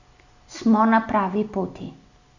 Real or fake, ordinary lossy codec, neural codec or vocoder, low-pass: real; none; none; 7.2 kHz